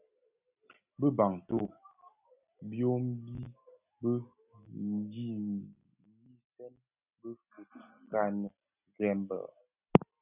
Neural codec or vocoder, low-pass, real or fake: none; 3.6 kHz; real